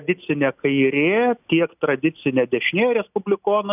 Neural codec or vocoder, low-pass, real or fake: none; 3.6 kHz; real